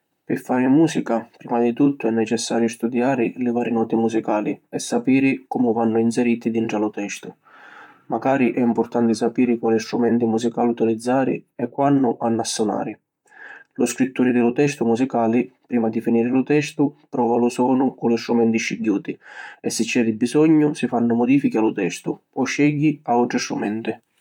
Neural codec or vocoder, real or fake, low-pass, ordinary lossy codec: vocoder, 44.1 kHz, 128 mel bands, Pupu-Vocoder; fake; 19.8 kHz; MP3, 96 kbps